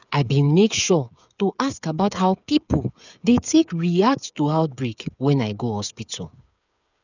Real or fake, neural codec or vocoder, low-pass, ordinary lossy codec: fake; codec, 16 kHz, 8 kbps, FreqCodec, smaller model; 7.2 kHz; none